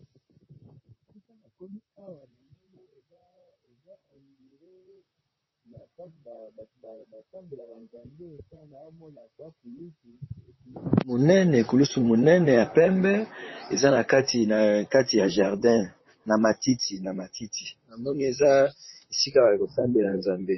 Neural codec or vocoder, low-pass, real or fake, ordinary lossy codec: vocoder, 44.1 kHz, 128 mel bands, Pupu-Vocoder; 7.2 kHz; fake; MP3, 24 kbps